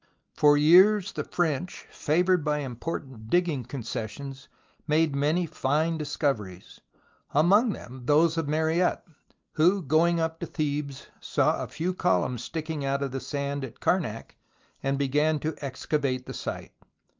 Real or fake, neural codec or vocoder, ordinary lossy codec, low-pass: real; none; Opus, 32 kbps; 7.2 kHz